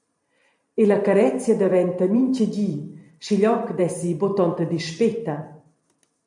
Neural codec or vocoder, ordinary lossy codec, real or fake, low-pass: vocoder, 44.1 kHz, 128 mel bands every 512 samples, BigVGAN v2; MP3, 64 kbps; fake; 10.8 kHz